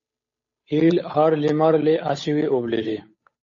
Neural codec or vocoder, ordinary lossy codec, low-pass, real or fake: codec, 16 kHz, 8 kbps, FunCodec, trained on Chinese and English, 25 frames a second; MP3, 32 kbps; 7.2 kHz; fake